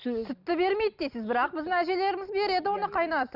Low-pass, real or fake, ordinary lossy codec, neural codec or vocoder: 5.4 kHz; real; none; none